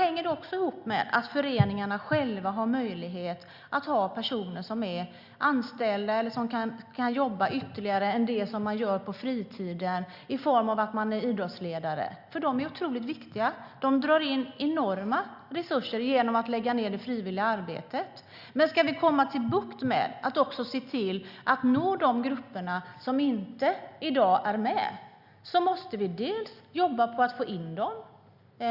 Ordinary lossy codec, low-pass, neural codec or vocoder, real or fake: Opus, 64 kbps; 5.4 kHz; none; real